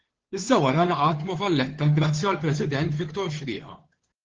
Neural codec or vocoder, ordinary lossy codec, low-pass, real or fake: codec, 16 kHz, 2 kbps, FunCodec, trained on Chinese and English, 25 frames a second; Opus, 16 kbps; 7.2 kHz; fake